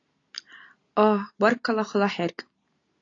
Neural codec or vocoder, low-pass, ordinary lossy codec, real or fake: none; 7.2 kHz; AAC, 32 kbps; real